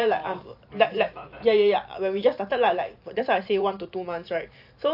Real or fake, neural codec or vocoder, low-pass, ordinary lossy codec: fake; vocoder, 44.1 kHz, 128 mel bands every 512 samples, BigVGAN v2; 5.4 kHz; AAC, 48 kbps